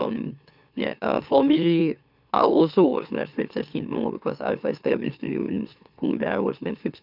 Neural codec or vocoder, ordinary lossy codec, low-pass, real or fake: autoencoder, 44.1 kHz, a latent of 192 numbers a frame, MeloTTS; none; 5.4 kHz; fake